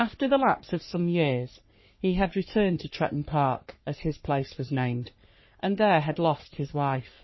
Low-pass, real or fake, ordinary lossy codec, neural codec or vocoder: 7.2 kHz; fake; MP3, 24 kbps; codec, 44.1 kHz, 3.4 kbps, Pupu-Codec